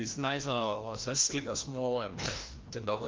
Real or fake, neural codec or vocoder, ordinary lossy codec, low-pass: fake; codec, 16 kHz, 1 kbps, FreqCodec, larger model; Opus, 32 kbps; 7.2 kHz